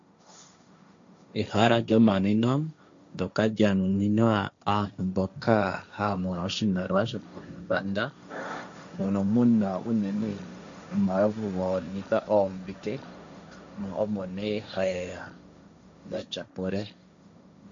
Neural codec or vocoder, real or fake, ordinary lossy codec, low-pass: codec, 16 kHz, 1.1 kbps, Voila-Tokenizer; fake; AAC, 64 kbps; 7.2 kHz